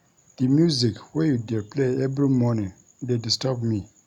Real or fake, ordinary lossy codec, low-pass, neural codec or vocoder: real; none; 19.8 kHz; none